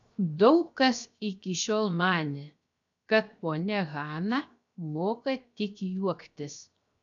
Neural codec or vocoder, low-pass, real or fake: codec, 16 kHz, 0.7 kbps, FocalCodec; 7.2 kHz; fake